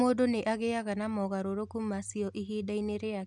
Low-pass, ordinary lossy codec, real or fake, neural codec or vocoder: 10.8 kHz; none; real; none